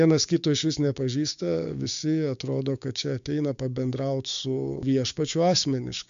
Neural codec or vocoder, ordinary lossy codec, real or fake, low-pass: codec, 16 kHz, 6 kbps, DAC; MP3, 64 kbps; fake; 7.2 kHz